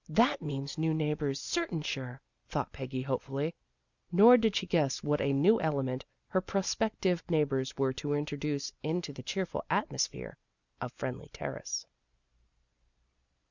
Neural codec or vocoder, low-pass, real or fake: none; 7.2 kHz; real